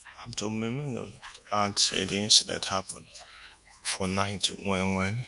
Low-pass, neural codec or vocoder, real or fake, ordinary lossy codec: 10.8 kHz; codec, 24 kHz, 1.2 kbps, DualCodec; fake; none